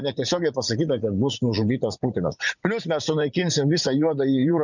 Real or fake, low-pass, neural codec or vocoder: fake; 7.2 kHz; vocoder, 44.1 kHz, 80 mel bands, Vocos